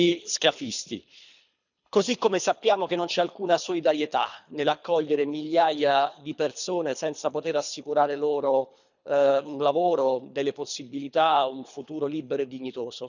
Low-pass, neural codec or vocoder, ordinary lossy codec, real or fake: 7.2 kHz; codec, 24 kHz, 3 kbps, HILCodec; none; fake